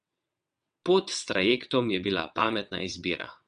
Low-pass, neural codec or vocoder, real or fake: 9.9 kHz; vocoder, 22.05 kHz, 80 mel bands, WaveNeXt; fake